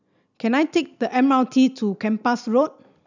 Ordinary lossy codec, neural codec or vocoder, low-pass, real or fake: none; none; 7.2 kHz; real